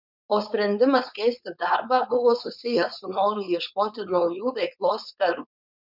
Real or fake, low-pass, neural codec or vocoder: fake; 5.4 kHz; codec, 16 kHz, 4.8 kbps, FACodec